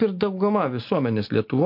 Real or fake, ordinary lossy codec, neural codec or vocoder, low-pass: real; MP3, 32 kbps; none; 5.4 kHz